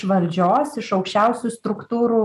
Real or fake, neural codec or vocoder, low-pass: real; none; 14.4 kHz